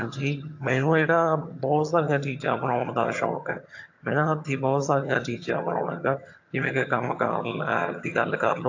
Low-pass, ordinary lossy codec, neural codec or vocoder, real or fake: 7.2 kHz; AAC, 48 kbps; vocoder, 22.05 kHz, 80 mel bands, HiFi-GAN; fake